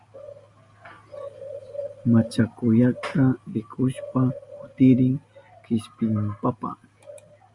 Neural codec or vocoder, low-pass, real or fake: none; 10.8 kHz; real